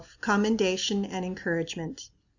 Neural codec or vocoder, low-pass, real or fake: none; 7.2 kHz; real